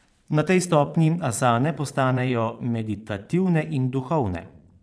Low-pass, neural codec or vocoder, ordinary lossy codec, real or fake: none; vocoder, 22.05 kHz, 80 mel bands, Vocos; none; fake